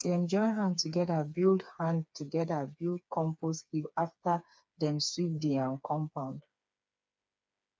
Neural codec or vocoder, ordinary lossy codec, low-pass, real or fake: codec, 16 kHz, 4 kbps, FreqCodec, smaller model; none; none; fake